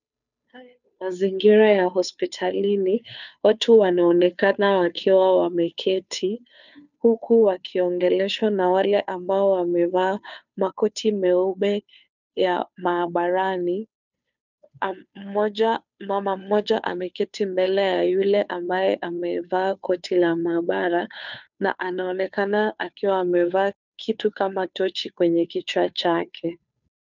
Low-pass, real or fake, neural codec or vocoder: 7.2 kHz; fake; codec, 16 kHz, 2 kbps, FunCodec, trained on Chinese and English, 25 frames a second